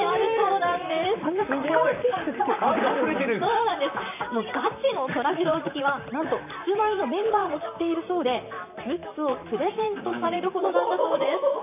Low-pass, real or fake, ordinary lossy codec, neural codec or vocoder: 3.6 kHz; fake; none; vocoder, 44.1 kHz, 128 mel bands, Pupu-Vocoder